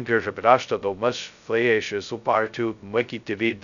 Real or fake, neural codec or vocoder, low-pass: fake; codec, 16 kHz, 0.2 kbps, FocalCodec; 7.2 kHz